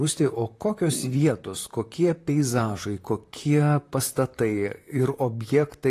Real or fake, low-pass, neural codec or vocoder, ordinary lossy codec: fake; 14.4 kHz; vocoder, 44.1 kHz, 128 mel bands, Pupu-Vocoder; AAC, 48 kbps